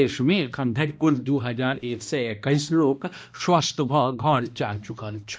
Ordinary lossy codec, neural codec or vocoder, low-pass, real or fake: none; codec, 16 kHz, 1 kbps, X-Codec, HuBERT features, trained on balanced general audio; none; fake